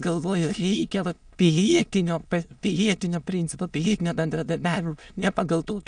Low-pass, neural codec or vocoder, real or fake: 9.9 kHz; autoencoder, 22.05 kHz, a latent of 192 numbers a frame, VITS, trained on many speakers; fake